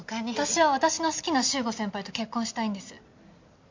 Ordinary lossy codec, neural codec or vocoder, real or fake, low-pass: MP3, 48 kbps; none; real; 7.2 kHz